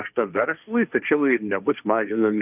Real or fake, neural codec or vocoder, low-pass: fake; codec, 16 kHz, 1.1 kbps, Voila-Tokenizer; 3.6 kHz